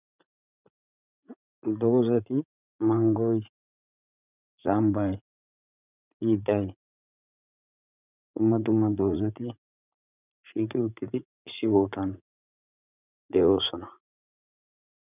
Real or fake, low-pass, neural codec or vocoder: fake; 3.6 kHz; vocoder, 44.1 kHz, 80 mel bands, Vocos